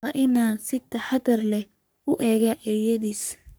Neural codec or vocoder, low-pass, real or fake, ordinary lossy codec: codec, 44.1 kHz, 3.4 kbps, Pupu-Codec; none; fake; none